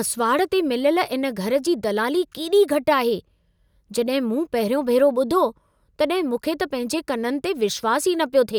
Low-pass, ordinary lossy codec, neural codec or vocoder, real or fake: none; none; none; real